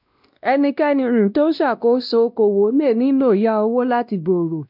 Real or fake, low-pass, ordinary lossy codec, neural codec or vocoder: fake; 5.4 kHz; none; codec, 16 kHz, 1 kbps, X-Codec, WavLM features, trained on Multilingual LibriSpeech